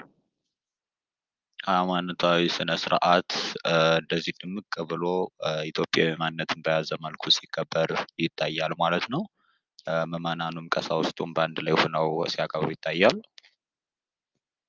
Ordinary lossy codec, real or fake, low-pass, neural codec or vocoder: Opus, 32 kbps; real; 7.2 kHz; none